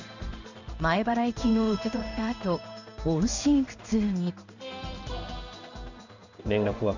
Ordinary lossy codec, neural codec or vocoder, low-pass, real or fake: none; codec, 16 kHz in and 24 kHz out, 1 kbps, XY-Tokenizer; 7.2 kHz; fake